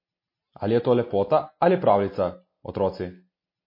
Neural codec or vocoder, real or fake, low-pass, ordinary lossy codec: none; real; 5.4 kHz; MP3, 24 kbps